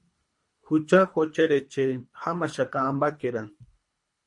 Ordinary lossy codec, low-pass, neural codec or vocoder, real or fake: MP3, 48 kbps; 10.8 kHz; codec, 24 kHz, 3 kbps, HILCodec; fake